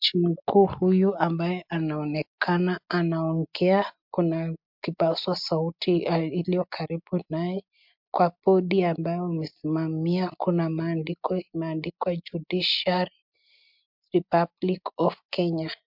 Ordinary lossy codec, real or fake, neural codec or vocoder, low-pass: MP3, 48 kbps; real; none; 5.4 kHz